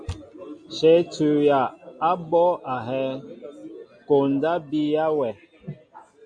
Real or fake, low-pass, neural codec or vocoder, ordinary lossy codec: real; 9.9 kHz; none; MP3, 64 kbps